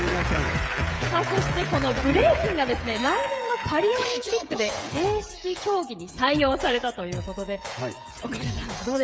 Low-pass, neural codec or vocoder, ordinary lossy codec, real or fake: none; codec, 16 kHz, 16 kbps, FreqCodec, smaller model; none; fake